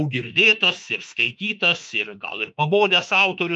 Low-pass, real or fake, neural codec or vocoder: 10.8 kHz; fake; autoencoder, 48 kHz, 32 numbers a frame, DAC-VAE, trained on Japanese speech